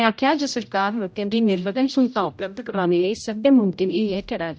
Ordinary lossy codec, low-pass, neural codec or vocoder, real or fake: none; none; codec, 16 kHz, 0.5 kbps, X-Codec, HuBERT features, trained on general audio; fake